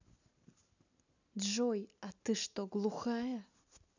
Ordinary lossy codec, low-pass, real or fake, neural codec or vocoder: none; 7.2 kHz; real; none